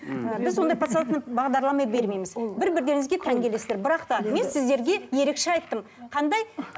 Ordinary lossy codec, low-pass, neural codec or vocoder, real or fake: none; none; none; real